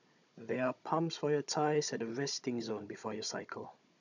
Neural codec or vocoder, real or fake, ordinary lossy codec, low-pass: codec, 16 kHz, 16 kbps, FunCodec, trained on Chinese and English, 50 frames a second; fake; none; 7.2 kHz